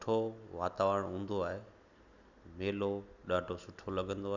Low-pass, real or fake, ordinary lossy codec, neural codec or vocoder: 7.2 kHz; real; none; none